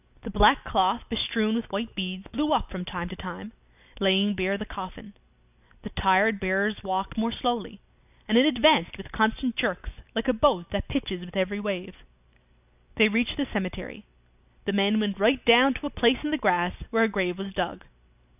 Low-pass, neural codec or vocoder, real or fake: 3.6 kHz; none; real